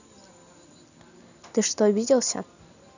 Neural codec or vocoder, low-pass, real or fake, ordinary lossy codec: none; 7.2 kHz; real; none